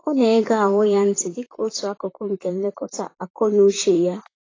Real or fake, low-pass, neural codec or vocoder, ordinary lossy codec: fake; 7.2 kHz; vocoder, 44.1 kHz, 128 mel bands, Pupu-Vocoder; AAC, 32 kbps